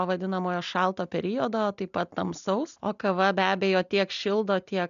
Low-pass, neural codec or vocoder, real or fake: 7.2 kHz; none; real